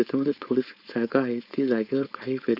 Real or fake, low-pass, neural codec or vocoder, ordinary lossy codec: fake; 5.4 kHz; codec, 16 kHz, 4.8 kbps, FACodec; Opus, 64 kbps